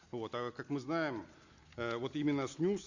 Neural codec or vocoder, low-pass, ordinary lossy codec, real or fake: none; 7.2 kHz; Opus, 64 kbps; real